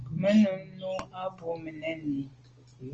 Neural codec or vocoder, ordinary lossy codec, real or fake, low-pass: none; Opus, 24 kbps; real; 7.2 kHz